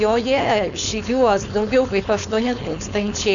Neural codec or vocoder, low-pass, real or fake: codec, 16 kHz, 4.8 kbps, FACodec; 7.2 kHz; fake